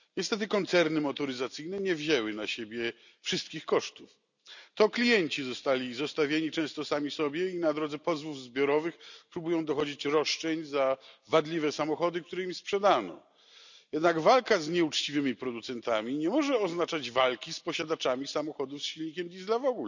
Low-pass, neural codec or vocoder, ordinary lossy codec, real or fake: 7.2 kHz; none; none; real